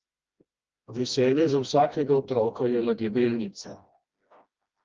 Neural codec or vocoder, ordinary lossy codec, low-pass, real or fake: codec, 16 kHz, 1 kbps, FreqCodec, smaller model; Opus, 24 kbps; 7.2 kHz; fake